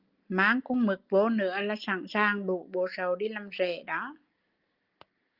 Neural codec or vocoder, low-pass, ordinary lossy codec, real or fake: none; 5.4 kHz; Opus, 32 kbps; real